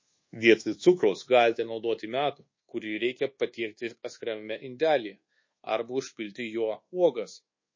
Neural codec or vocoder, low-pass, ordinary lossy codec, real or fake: codec, 24 kHz, 1.2 kbps, DualCodec; 7.2 kHz; MP3, 32 kbps; fake